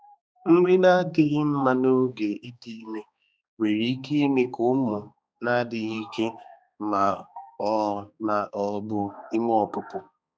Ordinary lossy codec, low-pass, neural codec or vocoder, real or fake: none; none; codec, 16 kHz, 2 kbps, X-Codec, HuBERT features, trained on balanced general audio; fake